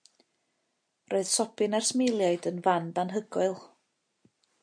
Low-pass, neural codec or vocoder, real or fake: 9.9 kHz; none; real